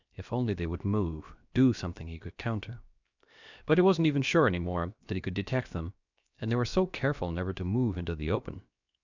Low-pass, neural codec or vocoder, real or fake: 7.2 kHz; codec, 16 kHz, 0.7 kbps, FocalCodec; fake